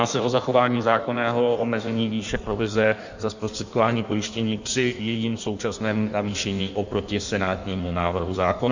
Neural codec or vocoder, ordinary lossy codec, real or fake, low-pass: codec, 16 kHz in and 24 kHz out, 1.1 kbps, FireRedTTS-2 codec; Opus, 64 kbps; fake; 7.2 kHz